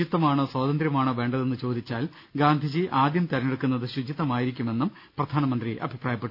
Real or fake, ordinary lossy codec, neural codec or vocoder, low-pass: real; none; none; 5.4 kHz